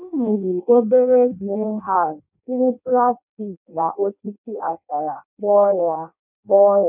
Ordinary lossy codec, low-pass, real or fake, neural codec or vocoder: none; 3.6 kHz; fake; codec, 16 kHz in and 24 kHz out, 0.6 kbps, FireRedTTS-2 codec